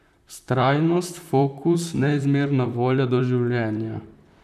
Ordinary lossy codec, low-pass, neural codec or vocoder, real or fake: none; 14.4 kHz; vocoder, 44.1 kHz, 128 mel bands, Pupu-Vocoder; fake